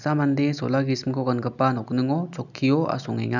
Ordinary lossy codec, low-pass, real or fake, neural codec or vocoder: none; 7.2 kHz; real; none